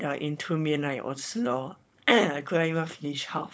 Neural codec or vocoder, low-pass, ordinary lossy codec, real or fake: codec, 16 kHz, 4.8 kbps, FACodec; none; none; fake